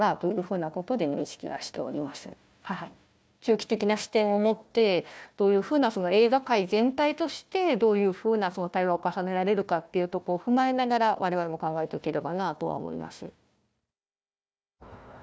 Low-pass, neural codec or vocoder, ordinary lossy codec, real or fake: none; codec, 16 kHz, 1 kbps, FunCodec, trained on Chinese and English, 50 frames a second; none; fake